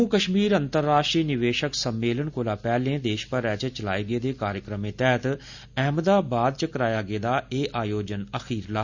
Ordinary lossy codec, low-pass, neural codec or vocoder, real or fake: Opus, 64 kbps; 7.2 kHz; none; real